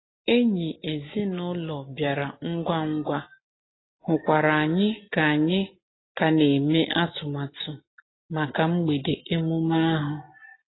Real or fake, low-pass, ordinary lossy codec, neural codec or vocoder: real; 7.2 kHz; AAC, 16 kbps; none